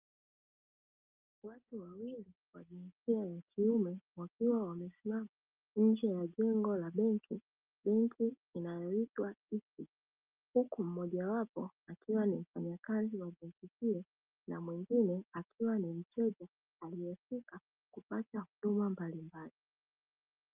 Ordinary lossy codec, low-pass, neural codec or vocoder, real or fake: Opus, 24 kbps; 3.6 kHz; none; real